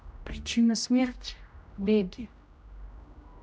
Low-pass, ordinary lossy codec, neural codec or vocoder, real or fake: none; none; codec, 16 kHz, 0.5 kbps, X-Codec, HuBERT features, trained on general audio; fake